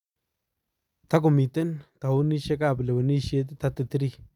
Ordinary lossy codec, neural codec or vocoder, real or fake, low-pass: none; none; real; 19.8 kHz